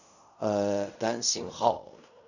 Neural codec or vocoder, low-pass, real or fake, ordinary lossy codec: codec, 16 kHz in and 24 kHz out, 0.4 kbps, LongCat-Audio-Codec, fine tuned four codebook decoder; 7.2 kHz; fake; none